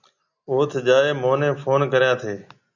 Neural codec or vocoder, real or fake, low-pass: none; real; 7.2 kHz